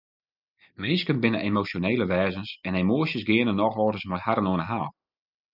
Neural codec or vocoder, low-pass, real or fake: none; 5.4 kHz; real